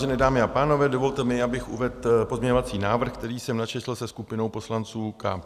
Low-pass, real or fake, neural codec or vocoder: 14.4 kHz; real; none